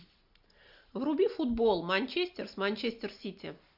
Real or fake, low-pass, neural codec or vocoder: real; 5.4 kHz; none